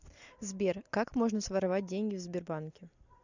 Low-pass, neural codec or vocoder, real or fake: 7.2 kHz; none; real